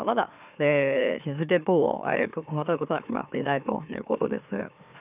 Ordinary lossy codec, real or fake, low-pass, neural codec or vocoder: none; fake; 3.6 kHz; autoencoder, 44.1 kHz, a latent of 192 numbers a frame, MeloTTS